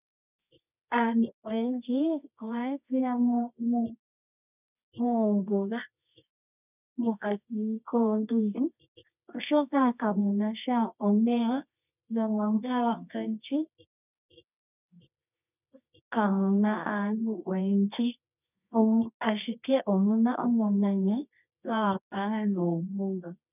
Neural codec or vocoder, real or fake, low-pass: codec, 24 kHz, 0.9 kbps, WavTokenizer, medium music audio release; fake; 3.6 kHz